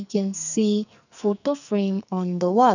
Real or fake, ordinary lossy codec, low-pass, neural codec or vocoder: fake; none; 7.2 kHz; codec, 44.1 kHz, 2.6 kbps, SNAC